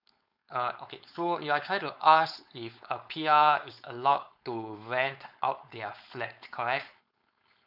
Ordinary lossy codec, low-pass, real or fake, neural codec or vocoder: none; 5.4 kHz; fake; codec, 16 kHz, 4.8 kbps, FACodec